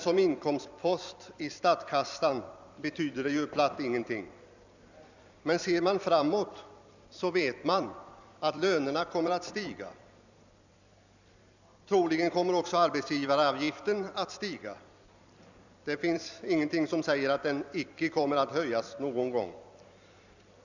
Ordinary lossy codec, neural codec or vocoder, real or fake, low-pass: none; vocoder, 44.1 kHz, 128 mel bands every 256 samples, BigVGAN v2; fake; 7.2 kHz